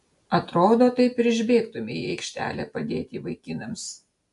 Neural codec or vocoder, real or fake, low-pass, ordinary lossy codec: none; real; 10.8 kHz; AAC, 64 kbps